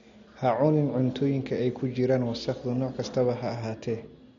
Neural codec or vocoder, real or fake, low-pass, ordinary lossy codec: none; real; 7.2 kHz; MP3, 48 kbps